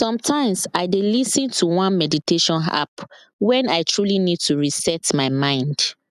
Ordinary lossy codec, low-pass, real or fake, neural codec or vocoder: none; 14.4 kHz; real; none